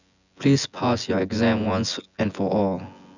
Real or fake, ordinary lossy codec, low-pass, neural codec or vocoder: fake; none; 7.2 kHz; vocoder, 24 kHz, 100 mel bands, Vocos